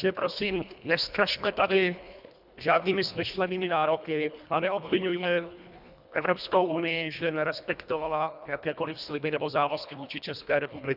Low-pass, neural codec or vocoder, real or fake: 5.4 kHz; codec, 24 kHz, 1.5 kbps, HILCodec; fake